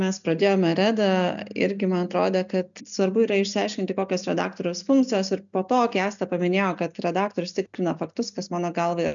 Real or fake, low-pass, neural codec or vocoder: real; 7.2 kHz; none